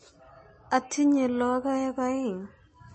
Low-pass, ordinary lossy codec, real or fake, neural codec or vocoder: 9.9 kHz; MP3, 32 kbps; real; none